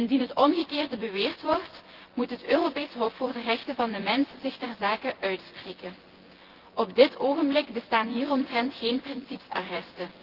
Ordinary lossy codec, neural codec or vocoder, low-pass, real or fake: Opus, 16 kbps; vocoder, 24 kHz, 100 mel bands, Vocos; 5.4 kHz; fake